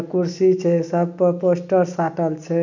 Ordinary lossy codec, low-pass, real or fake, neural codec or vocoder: none; 7.2 kHz; real; none